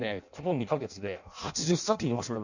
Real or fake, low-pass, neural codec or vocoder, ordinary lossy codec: fake; 7.2 kHz; codec, 16 kHz in and 24 kHz out, 0.6 kbps, FireRedTTS-2 codec; none